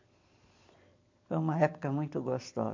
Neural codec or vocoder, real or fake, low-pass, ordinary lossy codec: none; real; 7.2 kHz; MP3, 48 kbps